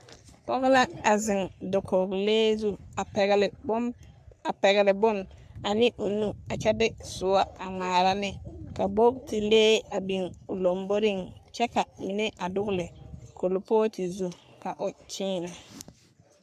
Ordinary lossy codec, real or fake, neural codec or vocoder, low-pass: AAC, 96 kbps; fake; codec, 44.1 kHz, 3.4 kbps, Pupu-Codec; 14.4 kHz